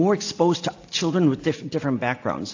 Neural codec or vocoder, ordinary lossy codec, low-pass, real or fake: none; AAC, 48 kbps; 7.2 kHz; real